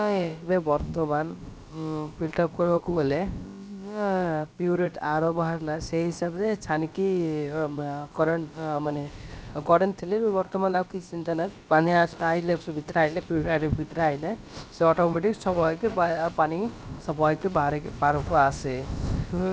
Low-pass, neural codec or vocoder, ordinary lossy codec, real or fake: none; codec, 16 kHz, about 1 kbps, DyCAST, with the encoder's durations; none; fake